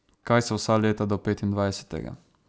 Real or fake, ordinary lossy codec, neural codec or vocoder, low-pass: real; none; none; none